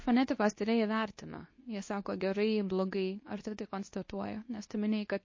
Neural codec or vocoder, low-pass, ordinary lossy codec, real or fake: codec, 24 kHz, 0.9 kbps, WavTokenizer, medium speech release version 2; 7.2 kHz; MP3, 32 kbps; fake